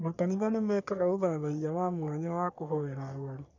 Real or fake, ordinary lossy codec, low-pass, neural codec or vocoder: fake; none; 7.2 kHz; codec, 44.1 kHz, 3.4 kbps, Pupu-Codec